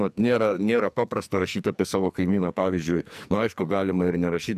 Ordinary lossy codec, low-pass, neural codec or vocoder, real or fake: MP3, 96 kbps; 14.4 kHz; codec, 44.1 kHz, 2.6 kbps, SNAC; fake